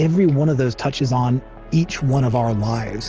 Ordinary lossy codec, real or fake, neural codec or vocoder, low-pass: Opus, 16 kbps; real; none; 7.2 kHz